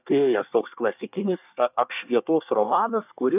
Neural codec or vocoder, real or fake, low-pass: codec, 24 kHz, 1 kbps, SNAC; fake; 3.6 kHz